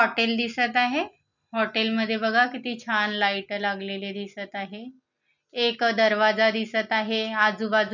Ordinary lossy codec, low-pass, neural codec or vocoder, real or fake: none; 7.2 kHz; none; real